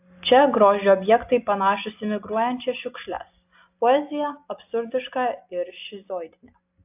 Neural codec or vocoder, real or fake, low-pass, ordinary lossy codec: none; real; 3.6 kHz; AAC, 32 kbps